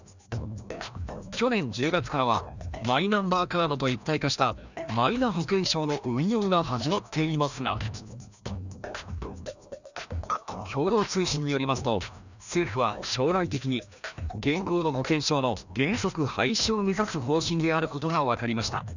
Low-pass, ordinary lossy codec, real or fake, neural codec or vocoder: 7.2 kHz; none; fake; codec, 16 kHz, 1 kbps, FreqCodec, larger model